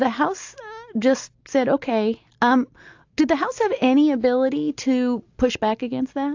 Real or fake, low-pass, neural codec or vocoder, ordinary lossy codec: real; 7.2 kHz; none; AAC, 48 kbps